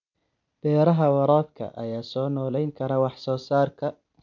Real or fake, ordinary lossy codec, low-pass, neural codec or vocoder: real; none; 7.2 kHz; none